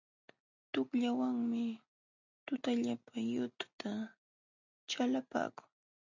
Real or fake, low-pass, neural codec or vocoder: real; 7.2 kHz; none